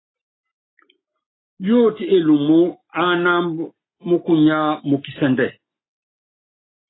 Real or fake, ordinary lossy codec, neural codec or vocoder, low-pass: real; AAC, 16 kbps; none; 7.2 kHz